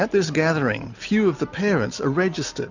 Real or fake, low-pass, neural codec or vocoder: real; 7.2 kHz; none